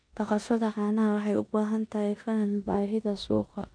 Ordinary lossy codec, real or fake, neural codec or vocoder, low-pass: none; fake; codec, 16 kHz in and 24 kHz out, 0.9 kbps, LongCat-Audio-Codec, four codebook decoder; 9.9 kHz